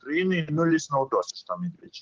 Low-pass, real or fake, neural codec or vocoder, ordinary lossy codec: 7.2 kHz; real; none; Opus, 16 kbps